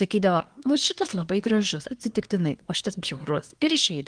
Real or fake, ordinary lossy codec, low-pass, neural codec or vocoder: fake; Opus, 24 kbps; 9.9 kHz; codec, 24 kHz, 1 kbps, SNAC